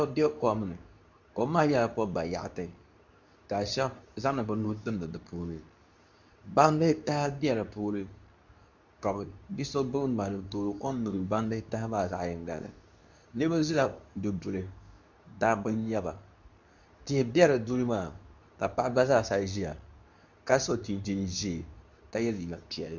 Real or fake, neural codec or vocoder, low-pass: fake; codec, 24 kHz, 0.9 kbps, WavTokenizer, medium speech release version 2; 7.2 kHz